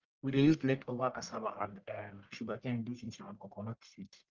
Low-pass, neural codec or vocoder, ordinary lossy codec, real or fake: 7.2 kHz; codec, 44.1 kHz, 1.7 kbps, Pupu-Codec; Opus, 24 kbps; fake